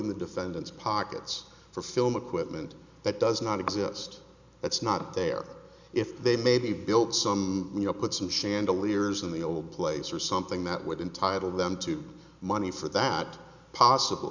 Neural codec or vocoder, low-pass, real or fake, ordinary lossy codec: none; 7.2 kHz; real; Opus, 64 kbps